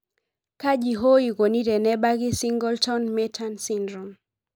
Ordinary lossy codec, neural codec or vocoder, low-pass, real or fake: none; none; none; real